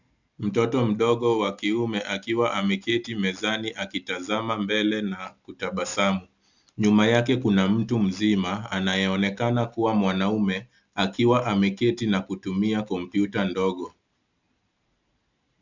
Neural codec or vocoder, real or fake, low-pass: none; real; 7.2 kHz